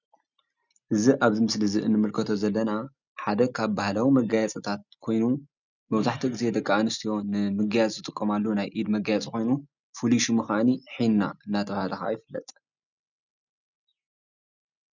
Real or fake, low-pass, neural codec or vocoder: real; 7.2 kHz; none